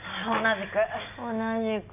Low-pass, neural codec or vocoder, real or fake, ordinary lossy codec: 3.6 kHz; none; real; none